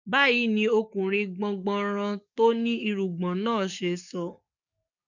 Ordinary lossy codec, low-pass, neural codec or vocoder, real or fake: none; 7.2 kHz; codec, 44.1 kHz, 7.8 kbps, DAC; fake